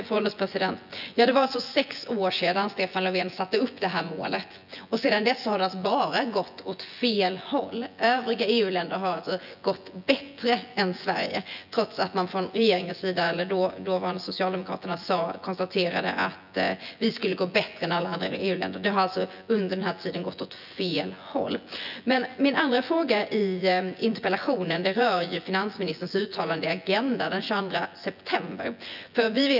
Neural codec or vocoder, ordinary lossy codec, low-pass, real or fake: vocoder, 24 kHz, 100 mel bands, Vocos; none; 5.4 kHz; fake